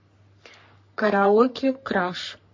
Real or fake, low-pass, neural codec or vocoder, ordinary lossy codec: fake; 7.2 kHz; codec, 44.1 kHz, 3.4 kbps, Pupu-Codec; MP3, 32 kbps